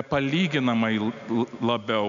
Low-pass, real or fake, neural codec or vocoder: 7.2 kHz; real; none